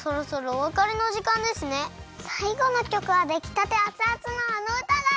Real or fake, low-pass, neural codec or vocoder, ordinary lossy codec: real; none; none; none